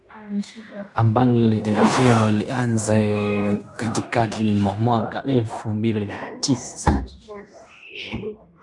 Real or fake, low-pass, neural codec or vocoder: fake; 10.8 kHz; codec, 16 kHz in and 24 kHz out, 0.9 kbps, LongCat-Audio-Codec, fine tuned four codebook decoder